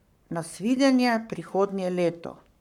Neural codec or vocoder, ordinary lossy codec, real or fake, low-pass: codec, 44.1 kHz, 7.8 kbps, Pupu-Codec; none; fake; 19.8 kHz